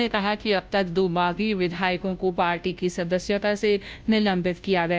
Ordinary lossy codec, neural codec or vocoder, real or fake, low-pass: none; codec, 16 kHz, 0.5 kbps, FunCodec, trained on Chinese and English, 25 frames a second; fake; none